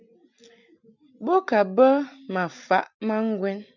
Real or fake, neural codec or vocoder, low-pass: real; none; 7.2 kHz